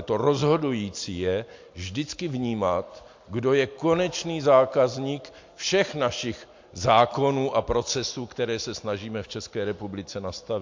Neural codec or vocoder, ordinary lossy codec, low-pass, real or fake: none; MP3, 48 kbps; 7.2 kHz; real